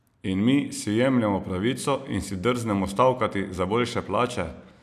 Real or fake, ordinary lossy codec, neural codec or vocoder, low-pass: real; none; none; 14.4 kHz